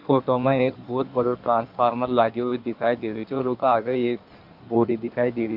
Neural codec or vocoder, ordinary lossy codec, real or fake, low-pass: codec, 16 kHz in and 24 kHz out, 1.1 kbps, FireRedTTS-2 codec; none; fake; 5.4 kHz